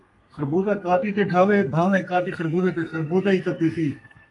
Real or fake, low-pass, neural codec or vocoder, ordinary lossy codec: fake; 10.8 kHz; codec, 32 kHz, 1.9 kbps, SNAC; AAC, 64 kbps